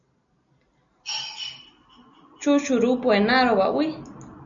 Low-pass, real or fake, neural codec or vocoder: 7.2 kHz; real; none